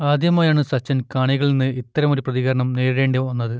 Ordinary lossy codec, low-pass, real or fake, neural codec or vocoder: none; none; real; none